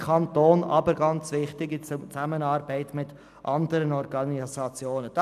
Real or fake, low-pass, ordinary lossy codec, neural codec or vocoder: real; 14.4 kHz; none; none